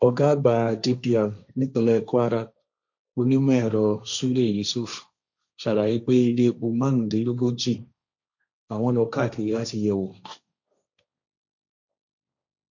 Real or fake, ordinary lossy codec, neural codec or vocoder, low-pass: fake; none; codec, 16 kHz, 1.1 kbps, Voila-Tokenizer; 7.2 kHz